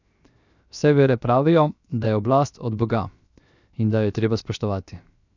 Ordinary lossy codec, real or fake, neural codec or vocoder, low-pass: none; fake; codec, 16 kHz, 0.7 kbps, FocalCodec; 7.2 kHz